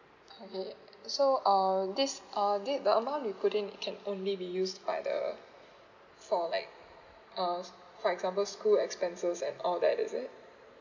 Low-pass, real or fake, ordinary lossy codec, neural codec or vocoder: 7.2 kHz; real; none; none